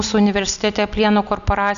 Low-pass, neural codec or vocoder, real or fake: 7.2 kHz; none; real